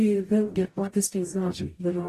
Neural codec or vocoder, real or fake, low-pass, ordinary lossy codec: codec, 44.1 kHz, 0.9 kbps, DAC; fake; 14.4 kHz; AAC, 48 kbps